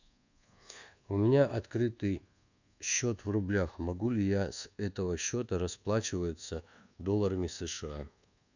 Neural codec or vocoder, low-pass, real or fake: codec, 24 kHz, 1.2 kbps, DualCodec; 7.2 kHz; fake